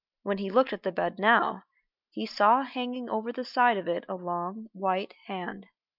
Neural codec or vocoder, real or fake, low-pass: none; real; 5.4 kHz